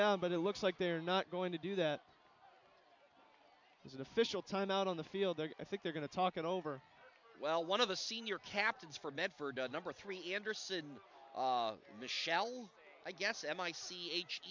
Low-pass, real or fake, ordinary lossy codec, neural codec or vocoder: 7.2 kHz; real; MP3, 64 kbps; none